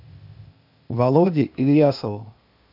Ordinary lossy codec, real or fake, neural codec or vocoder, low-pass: AAC, 48 kbps; fake; codec, 16 kHz, 0.8 kbps, ZipCodec; 5.4 kHz